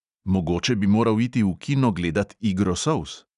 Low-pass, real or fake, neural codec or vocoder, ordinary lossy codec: 10.8 kHz; real; none; none